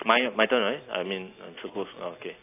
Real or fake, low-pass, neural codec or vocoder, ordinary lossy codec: real; 3.6 kHz; none; AAC, 24 kbps